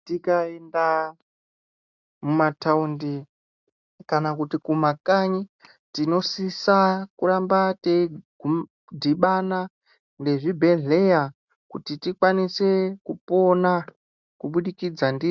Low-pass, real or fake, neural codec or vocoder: 7.2 kHz; real; none